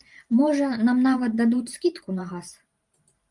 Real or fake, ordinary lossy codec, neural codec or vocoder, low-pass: fake; Opus, 32 kbps; vocoder, 44.1 kHz, 128 mel bands every 512 samples, BigVGAN v2; 10.8 kHz